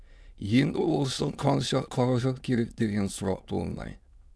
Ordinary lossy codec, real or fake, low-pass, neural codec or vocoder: none; fake; none; autoencoder, 22.05 kHz, a latent of 192 numbers a frame, VITS, trained on many speakers